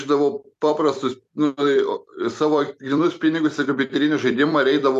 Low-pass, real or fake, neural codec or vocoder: 14.4 kHz; fake; vocoder, 44.1 kHz, 128 mel bands every 256 samples, BigVGAN v2